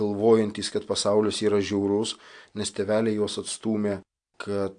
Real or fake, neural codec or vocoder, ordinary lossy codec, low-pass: real; none; AAC, 64 kbps; 9.9 kHz